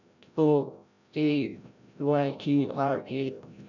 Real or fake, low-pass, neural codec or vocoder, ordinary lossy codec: fake; 7.2 kHz; codec, 16 kHz, 0.5 kbps, FreqCodec, larger model; none